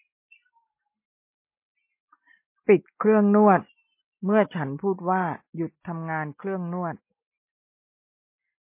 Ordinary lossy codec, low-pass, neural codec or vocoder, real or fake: MP3, 24 kbps; 3.6 kHz; none; real